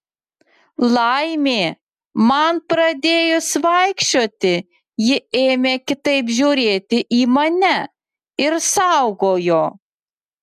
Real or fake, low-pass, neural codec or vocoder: real; 14.4 kHz; none